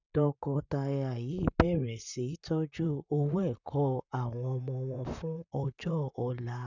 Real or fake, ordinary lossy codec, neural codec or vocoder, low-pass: fake; none; vocoder, 44.1 kHz, 128 mel bands, Pupu-Vocoder; 7.2 kHz